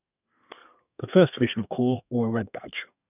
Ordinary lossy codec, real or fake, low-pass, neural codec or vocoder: none; fake; 3.6 kHz; codec, 32 kHz, 1.9 kbps, SNAC